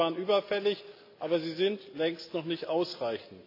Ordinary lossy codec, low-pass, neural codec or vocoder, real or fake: AAC, 32 kbps; 5.4 kHz; none; real